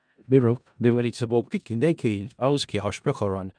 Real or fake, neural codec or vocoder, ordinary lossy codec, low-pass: fake; codec, 16 kHz in and 24 kHz out, 0.4 kbps, LongCat-Audio-Codec, four codebook decoder; MP3, 96 kbps; 9.9 kHz